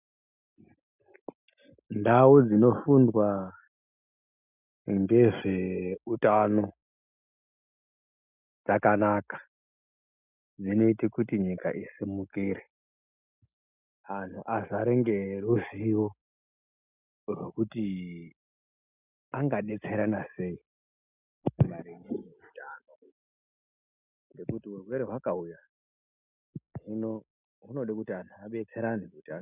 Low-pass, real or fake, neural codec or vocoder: 3.6 kHz; real; none